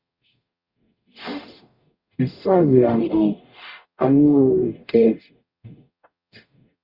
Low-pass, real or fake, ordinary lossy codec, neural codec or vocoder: 5.4 kHz; fake; Opus, 64 kbps; codec, 44.1 kHz, 0.9 kbps, DAC